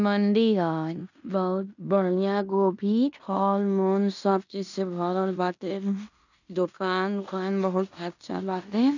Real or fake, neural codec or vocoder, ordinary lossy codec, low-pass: fake; codec, 16 kHz in and 24 kHz out, 0.9 kbps, LongCat-Audio-Codec, fine tuned four codebook decoder; none; 7.2 kHz